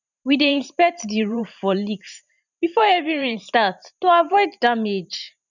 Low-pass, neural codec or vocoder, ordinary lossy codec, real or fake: 7.2 kHz; vocoder, 44.1 kHz, 128 mel bands every 512 samples, BigVGAN v2; none; fake